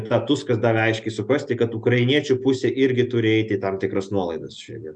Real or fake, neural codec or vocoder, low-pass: real; none; 10.8 kHz